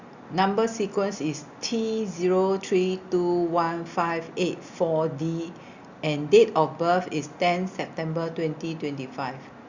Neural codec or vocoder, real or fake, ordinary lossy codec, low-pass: none; real; Opus, 64 kbps; 7.2 kHz